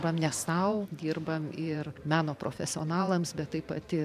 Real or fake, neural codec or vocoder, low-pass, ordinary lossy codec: fake; vocoder, 44.1 kHz, 128 mel bands every 512 samples, BigVGAN v2; 14.4 kHz; MP3, 96 kbps